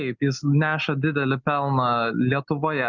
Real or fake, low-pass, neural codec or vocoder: real; 7.2 kHz; none